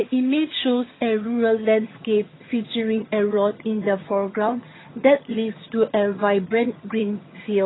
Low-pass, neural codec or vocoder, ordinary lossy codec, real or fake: 7.2 kHz; vocoder, 22.05 kHz, 80 mel bands, HiFi-GAN; AAC, 16 kbps; fake